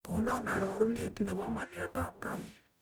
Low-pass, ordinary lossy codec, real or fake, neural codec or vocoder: none; none; fake; codec, 44.1 kHz, 0.9 kbps, DAC